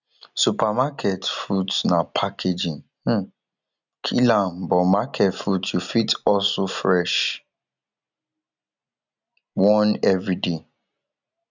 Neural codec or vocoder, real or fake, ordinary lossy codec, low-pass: none; real; none; 7.2 kHz